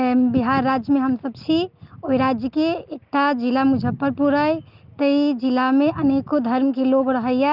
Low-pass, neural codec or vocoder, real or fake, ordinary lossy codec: 5.4 kHz; none; real; Opus, 24 kbps